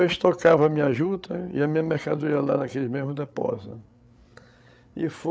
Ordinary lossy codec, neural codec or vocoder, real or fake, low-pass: none; codec, 16 kHz, 8 kbps, FreqCodec, larger model; fake; none